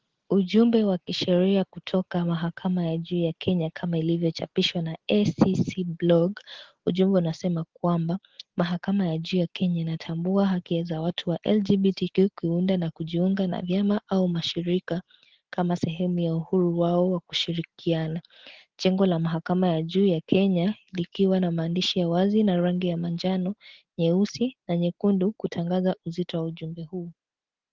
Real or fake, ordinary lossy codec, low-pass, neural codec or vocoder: real; Opus, 16 kbps; 7.2 kHz; none